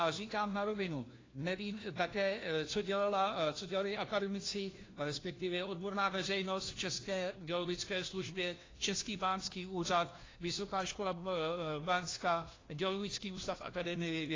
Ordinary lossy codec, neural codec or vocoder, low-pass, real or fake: AAC, 32 kbps; codec, 16 kHz, 1 kbps, FunCodec, trained on LibriTTS, 50 frames a second; 7.2 kHz; fake